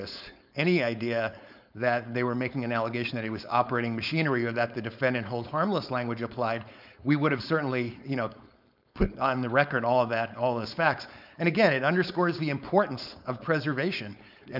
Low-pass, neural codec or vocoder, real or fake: 5.4 kHz; codec, 16 kHz, 4.8 kbps, FACodec; fake